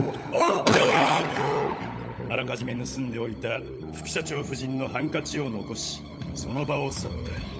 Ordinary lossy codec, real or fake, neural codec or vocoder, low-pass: none; fake; codec, 16 kHz, 16 kbps, FunCodec, trained on LibriTTS, 50 frames a second; none